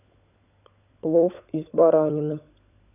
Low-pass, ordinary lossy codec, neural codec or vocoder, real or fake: 3.6 kHz; none; codec, 16 kHz, 16 kbps, FunCodec, trained on LibriTTS, 50 frames a second; fake